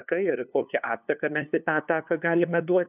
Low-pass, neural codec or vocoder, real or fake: 3.6 kHz; codec, 16 kHz, 2 kbps, FunCodec, trained on LibriTTS, 25 frames a second; fake